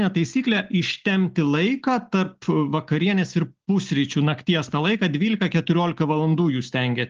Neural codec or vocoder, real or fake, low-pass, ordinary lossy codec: none; real; 7.2 kHz; Opus, 16 kbps